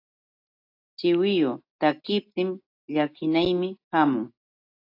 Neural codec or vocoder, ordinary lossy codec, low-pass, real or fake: none; AAC, 32 kbps; 5.4 kHz; real